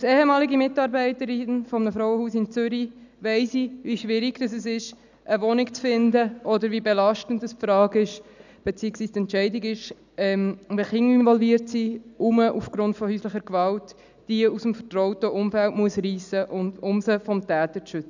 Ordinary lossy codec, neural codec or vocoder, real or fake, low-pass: none; none; real; 7.2 kHz